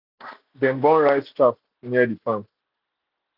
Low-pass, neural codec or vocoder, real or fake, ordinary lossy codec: 5.4 kHz; none; real; none